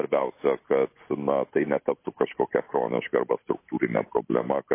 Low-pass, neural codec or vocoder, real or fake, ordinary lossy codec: 3.6 kHz; none; real; MP3, 24 kbps